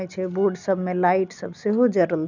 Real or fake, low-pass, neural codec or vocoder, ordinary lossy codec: real; 7.2 kHz; none; none